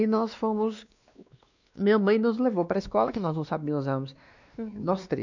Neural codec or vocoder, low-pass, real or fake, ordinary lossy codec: codec, 16 kHz, 2 kbps, X-Codec, WavLM features, trained on Multilingual LibriSpeech; 7.2 kHz; fake; none